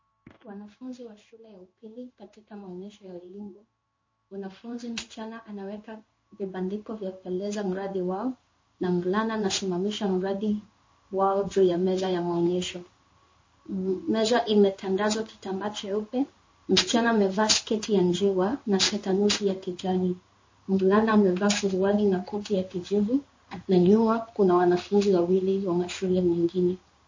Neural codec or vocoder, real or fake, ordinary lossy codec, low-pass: codec, 16 kHz in and 24 kHz out, 1 kbps, XY-Tokenizer; fake; MP3, 32 kbps; 7.2 kHz